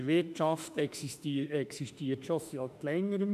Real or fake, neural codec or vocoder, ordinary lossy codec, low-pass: fake; autoencoder, 48 kHz, 32 numbers a frame, DAC-VAE, trained on Japanese speech; none; 14.4 kHz